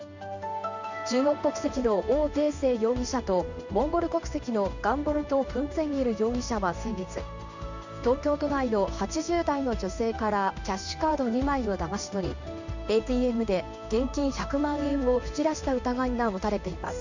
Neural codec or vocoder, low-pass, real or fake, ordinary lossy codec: codec, 16 kHz in and 24 kHz out, 1 kbps, XY-Tokenizer; 7.2 kHz; fake; none